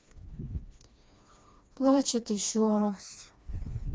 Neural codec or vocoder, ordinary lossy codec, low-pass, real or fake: codec, 16 kHz, 2 kbps, FreqCodec, smaller model; none; none; fake